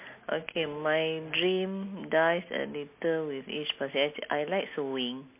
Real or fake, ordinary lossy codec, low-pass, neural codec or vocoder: real; MP3, 24 kbps; 3.6 kHz; none